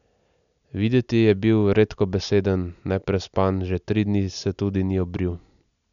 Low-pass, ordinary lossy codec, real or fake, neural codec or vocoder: 7.2 kHz; none; real; none